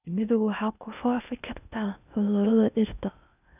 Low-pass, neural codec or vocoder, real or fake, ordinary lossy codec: 3.6 kHz; codec, 16 kHz in and 24 kHz out, 0.6 kbps, FocalCodec, streaming, 2048 codes; fake; none